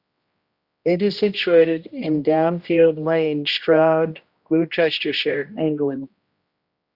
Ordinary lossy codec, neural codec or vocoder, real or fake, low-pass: Opus, 64 kbps; codec, 16 kHz, 1 kbps, X-Codec, HuBERT features, trained on general audio; fake; 5.4 kHz